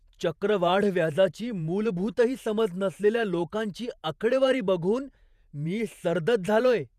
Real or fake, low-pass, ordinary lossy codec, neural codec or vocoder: fake; 14.4 kHz; none; vocoder, 48 kHz, 128 mel bands, Vocos